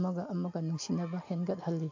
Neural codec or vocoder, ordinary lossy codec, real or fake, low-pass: none; AAC, 48 kbps; real; 7.2 kHz